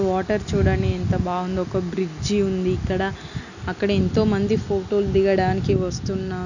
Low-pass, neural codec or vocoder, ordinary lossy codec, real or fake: 7.2 kHz; none; none; real